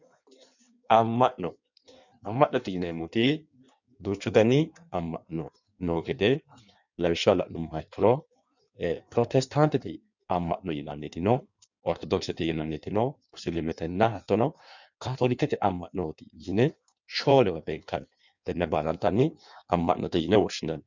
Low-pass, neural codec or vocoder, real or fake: 7.2 kHz; codec, 16 kHz in and 24 kHz out, 1.1 kbps, FireRedTTS-2 codec; fake